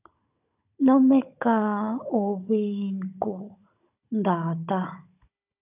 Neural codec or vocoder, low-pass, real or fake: codec, 16 kHz, 16 kbps, FunCodec, trained on Chinese and English, 50 frames a second; 3.6 kHz; fake